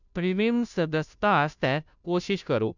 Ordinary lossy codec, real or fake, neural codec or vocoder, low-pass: none; fake; codec, 16 kHz, 0.5 kbps, FunCodec, trained on Chinese and English, 25 frames a second; 7.2 kHz